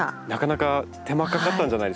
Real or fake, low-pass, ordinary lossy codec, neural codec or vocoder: real; none; none; none